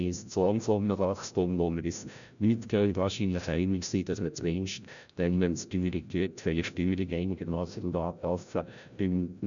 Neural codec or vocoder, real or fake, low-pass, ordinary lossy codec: codec, 16 kHz, 0.5 kbps, FreqCodec, larger model; fake; 7.2 kHz; none